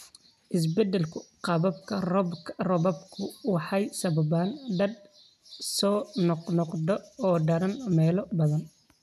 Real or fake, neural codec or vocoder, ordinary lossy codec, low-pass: real; none; none; 14.4 kHz